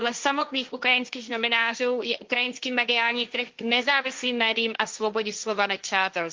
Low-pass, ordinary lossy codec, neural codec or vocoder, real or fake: 7.2 kHz; Opus, 32 kbps; codec, 16 kHz, 1.1 kbps, Voila-Tokenizer; fake